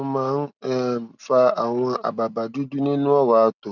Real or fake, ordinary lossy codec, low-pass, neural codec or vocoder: real; none; 7.2 kHz; none